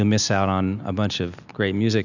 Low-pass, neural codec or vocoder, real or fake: 7.2 kHz; none; real